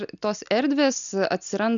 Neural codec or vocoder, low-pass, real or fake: none; 7.2 kHz; real